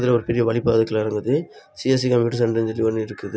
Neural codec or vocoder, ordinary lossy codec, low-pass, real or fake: none; none; none; real